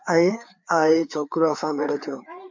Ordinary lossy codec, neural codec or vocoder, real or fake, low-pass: MP3, 64 kbps; codec, 16 kHz, 4 kbps, FreqCodec, larger model; fake; 7.2 kHz